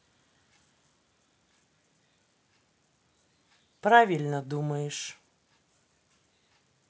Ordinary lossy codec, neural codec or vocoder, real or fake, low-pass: none; none; real; none